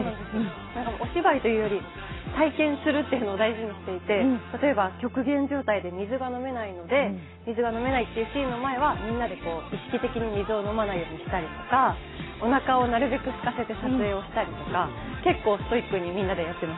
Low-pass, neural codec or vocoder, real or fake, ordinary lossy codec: 7.2 kHz; none; real; AAC, 16 kbps